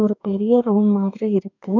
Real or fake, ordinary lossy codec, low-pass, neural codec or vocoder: fake; none; 7.2 kHz; codec, 44.1 kHz, 2.6 kbps, DAC